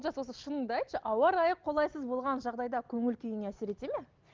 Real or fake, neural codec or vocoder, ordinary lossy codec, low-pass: real; none; Opus, 32 kbps; 7.2 kHz